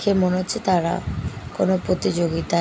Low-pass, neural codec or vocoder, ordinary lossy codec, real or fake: none; none; none; real